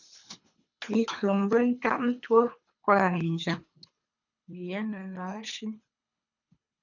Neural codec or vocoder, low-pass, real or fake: codec, 24 kHz, 3 kbps, HILCodec; 7.2 kHz; fake